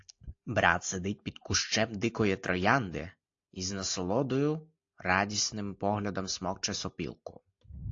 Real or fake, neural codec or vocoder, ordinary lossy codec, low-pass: real; none; AAC, 48 kbps; 7.2 kHz